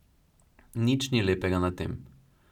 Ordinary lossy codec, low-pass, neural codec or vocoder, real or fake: none; 19.8 kHz; none; real